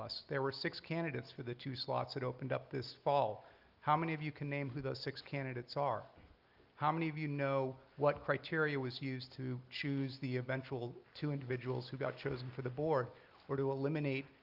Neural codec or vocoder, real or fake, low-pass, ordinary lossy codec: none; real; 5.4 kHz; Opus, 32 kbps